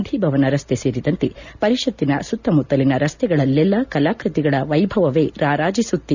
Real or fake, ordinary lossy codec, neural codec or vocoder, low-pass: real; none; none; 7.2 kHz